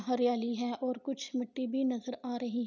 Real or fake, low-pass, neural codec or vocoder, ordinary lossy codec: real; 7.2 kHz; none; none